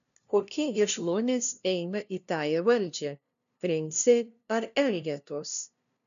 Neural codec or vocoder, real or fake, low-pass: codec, 16 kHz, 0.5 kbps, FunCodec, trained on LibriTTS, 25 frames a second; fake; 7.2 kHz